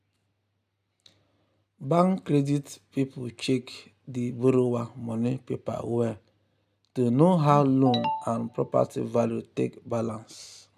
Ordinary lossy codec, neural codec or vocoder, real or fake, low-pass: none; none; real; 14.4 kHz